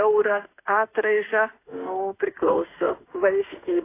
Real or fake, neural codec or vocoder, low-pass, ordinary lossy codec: fake; autoencoder, 48 kHz, 32 numbers a frame, DAC-VAE, trained on Japanese speech; 3.6 kHz; AAC, 24 kbps